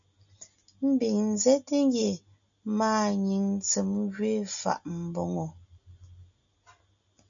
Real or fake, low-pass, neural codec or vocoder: real; 7.2 kHz; none